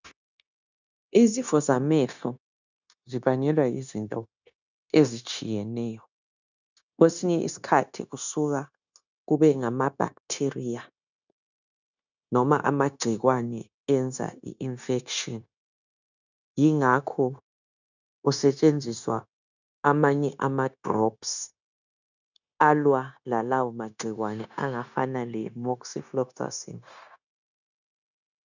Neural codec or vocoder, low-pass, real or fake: codec, 16 kHz, 0.9 kbps, LongCat-Audio-Codec; 7.2 kHz; fake